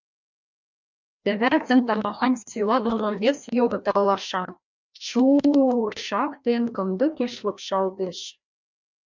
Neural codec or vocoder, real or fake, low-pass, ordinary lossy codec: codec, 16 kHz, 1 kbps, FreqCodec, larger model; fake; 7.2 kHz; MP3, 64 kbps